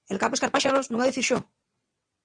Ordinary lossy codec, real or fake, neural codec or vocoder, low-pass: Opus, 64 kbps; fake; vocoder, 22.05 kHz, 80 mel bands, WaveNeXt; 9.9 kHz